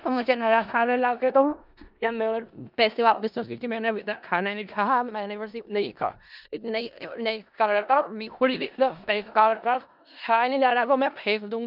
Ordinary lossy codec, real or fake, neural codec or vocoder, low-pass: none; fake; codec, 16 kHz in and 24 kHz out, 0.4 kbps, LongCat-Audio-Codec, four codebook decoder; 5.4 kHz